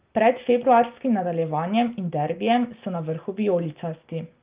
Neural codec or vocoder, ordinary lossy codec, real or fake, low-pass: none; Opus, 32 kbps; real; 3.6 kHz